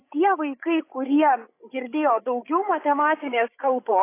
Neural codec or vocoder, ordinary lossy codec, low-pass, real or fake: codec, 16 kHz, 16 kbps, FreqCodec, larger model; AAC, 24 kbps; 3.6 kHz; fake